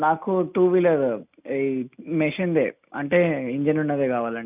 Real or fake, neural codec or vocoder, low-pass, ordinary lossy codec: real; none; 3.6 kHz; none